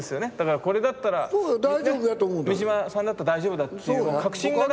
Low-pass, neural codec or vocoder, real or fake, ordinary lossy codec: none; none; real; none